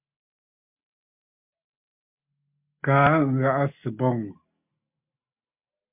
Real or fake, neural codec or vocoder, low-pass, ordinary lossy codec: real; none; 3.6 kHz; MP3, 32 kbps